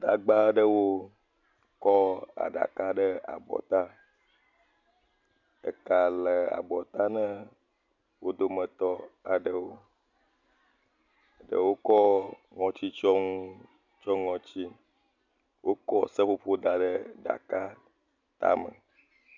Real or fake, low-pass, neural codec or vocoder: real; 7.2 kHz; none